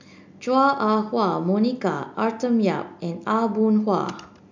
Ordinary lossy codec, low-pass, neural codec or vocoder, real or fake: MP3, 64 kbps; 7.2 kHz; none; real